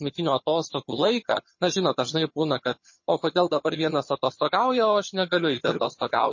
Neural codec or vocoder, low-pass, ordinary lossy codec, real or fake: vocoder, 22.05 kHz, 80 mel bands, HiFi-GAN; 7.2 kHz; MP3, 32 kbps; fake